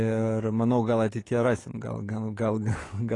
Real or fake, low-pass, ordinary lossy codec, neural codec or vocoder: fake; 10.8 kHz; AAC, 32 kbps; codec, 44.1 kHz, 7.8 kbps, DAC